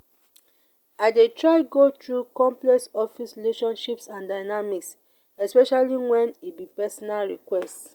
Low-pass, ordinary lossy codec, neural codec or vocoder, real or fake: 19.8 kHz; Opus, 64 kbps; none; real